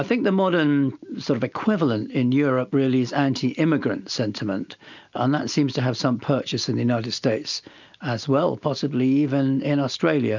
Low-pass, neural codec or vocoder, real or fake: 7.2 kHz; none; real